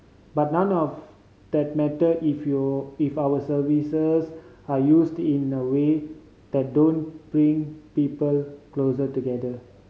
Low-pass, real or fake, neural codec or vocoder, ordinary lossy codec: none; real; none; none